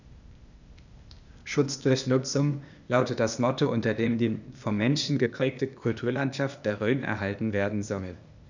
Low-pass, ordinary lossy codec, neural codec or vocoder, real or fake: 7.2 kHz; none; codec, 16 kHz, 0.8 kbps, ZipCodec; fake